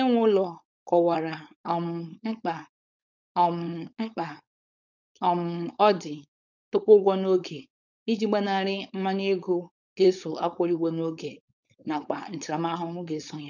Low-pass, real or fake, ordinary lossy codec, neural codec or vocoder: 7.2 kHz; fake; none; codec, 16 kHz, 4.8 kbps, FACodec